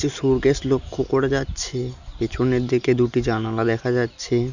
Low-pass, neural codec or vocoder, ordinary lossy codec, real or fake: 7.2 kHz; none; none; real